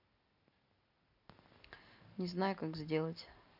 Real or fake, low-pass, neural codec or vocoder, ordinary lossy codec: real; 5.4 kHz; none; none